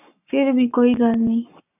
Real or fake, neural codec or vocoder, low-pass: fake; codec, 44.1 kHz, 7.8 kbps, Pupu-Codec; 3.6 kHz